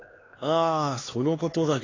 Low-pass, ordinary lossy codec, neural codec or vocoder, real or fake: 7.2 kHz; AAC, 32 kbps; codec, 16 kHz, 2 kbps, X-Codec, HuBERT features, trained on LibriSpeech; fake